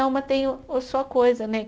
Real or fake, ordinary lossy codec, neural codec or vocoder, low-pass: real; none; none; none